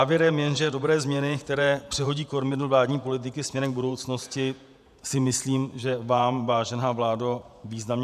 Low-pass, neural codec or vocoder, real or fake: 14.4 kHz; vocoder, 48 kHz, 128 mel bands, Vocos; fake